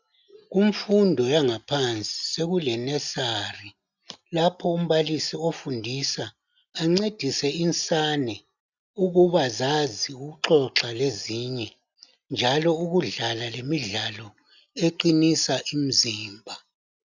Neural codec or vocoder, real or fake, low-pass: none; real; 7.2 kHz